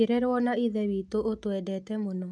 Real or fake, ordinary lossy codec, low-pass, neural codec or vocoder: real; none; none; none